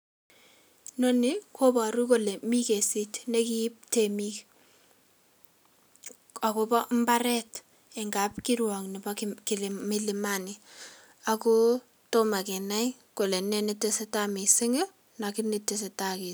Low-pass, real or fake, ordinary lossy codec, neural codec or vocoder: none; real; none; none